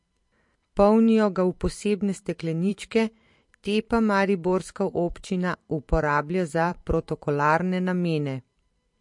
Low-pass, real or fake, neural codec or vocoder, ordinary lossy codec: 10.8 kHz; fake; vocoder, 44.1 kHz, 128 mel bands every 512 samples, BigVGAN v2; MP3, 48 kbps